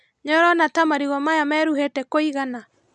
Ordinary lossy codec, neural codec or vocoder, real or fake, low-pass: none; none; real; 10.8 kHz